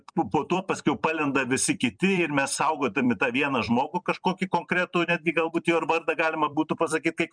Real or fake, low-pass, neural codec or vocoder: fake; 9.9 kHz; vocoder, 44.1 kHz, 128 mel bands every 256 samples, BigVGAN v2